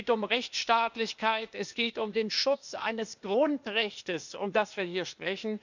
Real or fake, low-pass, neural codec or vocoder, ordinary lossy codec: fake; 7.2 kHz; codec, 16 kHz, 0.8 kbps, ZipCodec; none